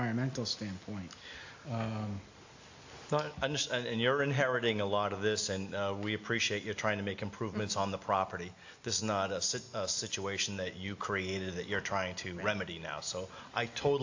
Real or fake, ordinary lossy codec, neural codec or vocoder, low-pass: real; AAC, 48 kbps; none; 7.2 kHz